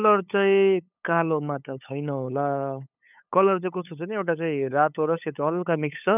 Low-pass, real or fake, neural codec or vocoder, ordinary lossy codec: 3.6 kHz; fake; codec, 16 kHz, 8 kbps, FunCodec, trained on LibriTTS, 25 frames a second; none